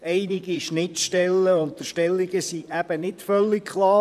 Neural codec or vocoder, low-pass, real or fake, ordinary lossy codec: vocoder, 44.1 kHz, 128 mel bands, Pupu-Vocoder; 14.4 kHz; fake; none